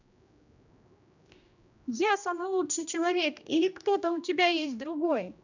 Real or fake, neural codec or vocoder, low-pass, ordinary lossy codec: fake; codec, 16 kHz, 1 kbps, X-Codec, HuBERT features, trained on general audio; 7.2 kHz; none